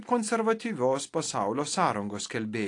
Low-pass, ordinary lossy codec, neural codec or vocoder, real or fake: 10.8 kHz; AAC, 48 kbps; none; real